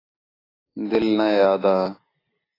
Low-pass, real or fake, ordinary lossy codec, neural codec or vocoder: 5.4 kHz; real; AAC, 24 kbps; none